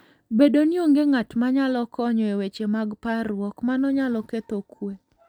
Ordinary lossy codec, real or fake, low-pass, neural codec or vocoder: none; real; 19.8 kHz; none